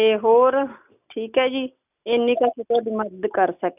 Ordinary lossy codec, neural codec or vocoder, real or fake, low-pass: none; none; real; 3.6 kHz